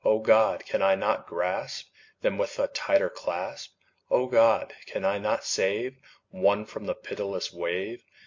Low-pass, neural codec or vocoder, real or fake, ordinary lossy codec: 7.2 kHz; none; real; MP3, 48 kbps